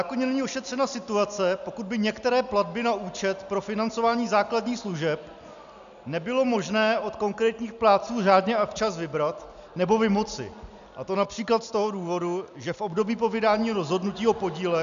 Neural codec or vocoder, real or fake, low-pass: none; real; 7.2 kHz